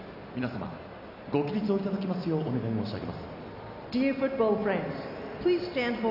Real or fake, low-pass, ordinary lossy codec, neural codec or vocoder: real; 5.4 kHz; none; none